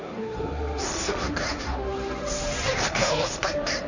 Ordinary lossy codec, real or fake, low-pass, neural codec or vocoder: none; fake; 7.2 kHz; codec, 16 kHz, 1.1 kbps, Voila-Tokenizer